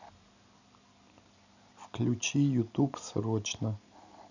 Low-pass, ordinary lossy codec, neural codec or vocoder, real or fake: 7.2 kHz; none; none; real